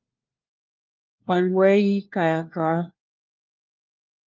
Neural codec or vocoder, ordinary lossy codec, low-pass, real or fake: codec, 16 kHz, 1 kbps, FunCodec, trained on LibriTTS, 50 frames a second; Opus, 32 kbps; 7.2 kHz; fake